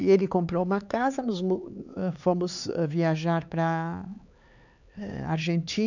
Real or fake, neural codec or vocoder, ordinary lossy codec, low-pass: fake; codec, 16 kHz, 4 kbps, X-Codec, HuBERT features, trained on LibriSpeech; none; 7.2 kHz